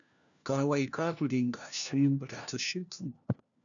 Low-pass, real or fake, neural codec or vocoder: 7.2 kHz; fake; codec, 16 kHz, 1 kbps, FunCodec, trained on LibriTTS, 50 frames a second